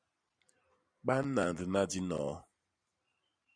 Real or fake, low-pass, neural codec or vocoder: fake; 9.9 kHz; vocoder, 44.1 kHz, 128 mel bands every 512 samples, BigVGAN v2